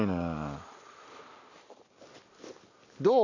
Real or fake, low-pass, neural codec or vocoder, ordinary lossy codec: real; 7.2 kHz; none; none